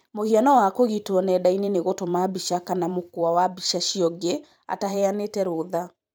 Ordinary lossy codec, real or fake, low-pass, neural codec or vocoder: none; fake; none; vocoder, 44.1 kHz, 128 mel bands every 512 samples, BigVGAN v2